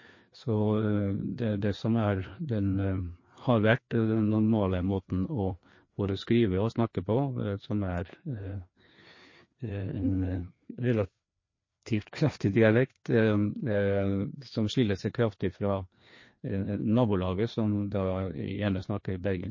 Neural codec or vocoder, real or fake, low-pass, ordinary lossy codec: codec, 16 kHz, 2 kbps, FreqCodec, larger model; fake; 7.2 kHz; MP3, 32 kbps